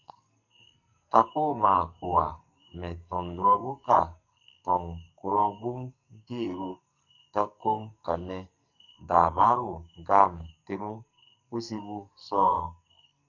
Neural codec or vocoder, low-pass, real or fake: codec, 44.1 kHz, 2.6 kbps, SNAC; 7.2 kHz; fake